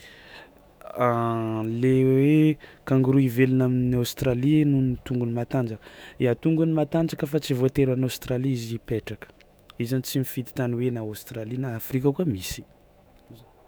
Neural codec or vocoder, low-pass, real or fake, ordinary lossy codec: autoencoder, 48 kHz, 128 numbers a frame, DAC-VAE, trained on Japanese speech; none; fake; none